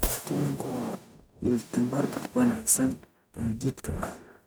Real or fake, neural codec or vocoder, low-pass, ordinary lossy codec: fake; codec, 44.1 kHz, 0.9 kbps, DAC; none; none